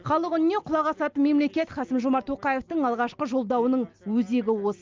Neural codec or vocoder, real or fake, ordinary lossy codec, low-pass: none; real; Opus, 32 kbps; 7.2 kHz